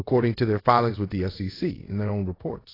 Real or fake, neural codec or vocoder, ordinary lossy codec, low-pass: fake; codec, 24 kHz, 0.5 kbps, DualCodec; AAC, 24 kbps; 5.4 kHz